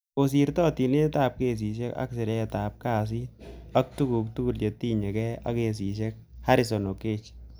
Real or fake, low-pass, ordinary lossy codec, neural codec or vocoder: real; none; none; none